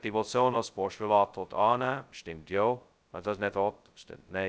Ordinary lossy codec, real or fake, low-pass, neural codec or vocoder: none; fake; none; codec, 16 kHz, 0.2 kbps, FocalCodec